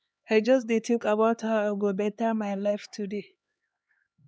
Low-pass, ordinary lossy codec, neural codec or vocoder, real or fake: none; none; codec, 16 kHz, 2 kbps, X-Codec, HuBERT features, trained on LibriSpeech; fake